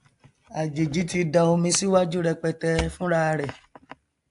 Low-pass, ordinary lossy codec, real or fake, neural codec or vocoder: 10.8 kHz; AAC, 64 kbps; real; none